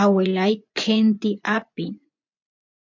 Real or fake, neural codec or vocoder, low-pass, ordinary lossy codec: fake; vocoder, 24 kHz, 100 mel bands, Vocos; 7.2 kHz; MP3, 64 kbps